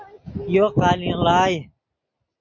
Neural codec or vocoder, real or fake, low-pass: none; real; 7.2 kHz